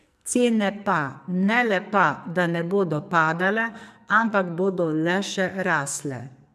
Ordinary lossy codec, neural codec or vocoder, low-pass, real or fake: none; codec, 44.1 kHz, 2.6 kbps, SNAC; 14.4 kHz; fake